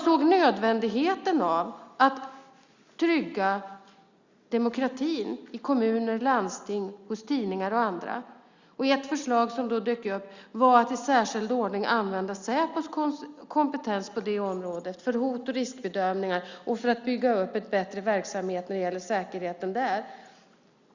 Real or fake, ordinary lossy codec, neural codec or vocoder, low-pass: real; Opus, 64 kbps; none; 7.2 kHz